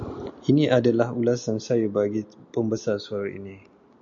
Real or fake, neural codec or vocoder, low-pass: real; none; 7.2 kHz